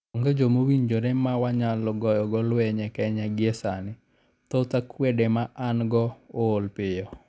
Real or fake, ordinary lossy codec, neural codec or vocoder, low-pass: real; none; none; none